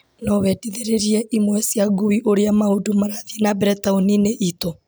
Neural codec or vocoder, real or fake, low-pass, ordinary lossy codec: none; real; none; none